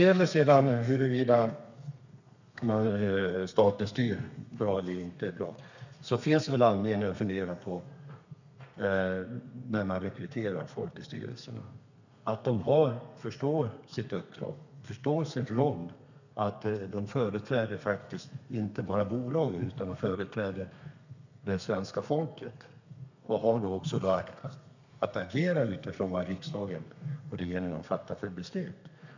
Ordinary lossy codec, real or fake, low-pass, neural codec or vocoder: none; fake; 7.2 kHz; codec, 32 kHz, 1.9 kbps, SNAC